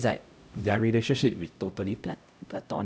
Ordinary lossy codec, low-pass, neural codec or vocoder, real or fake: none; none; codec, 16 kHz, 0.5 kbps, X-Codec, HuBERT features, trained on LibriSpeech; fake